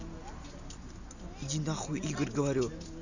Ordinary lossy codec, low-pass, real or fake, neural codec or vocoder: none; 7.2 kHz; real; none